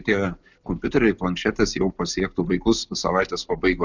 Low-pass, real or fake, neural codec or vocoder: 7.2 kHz; real; none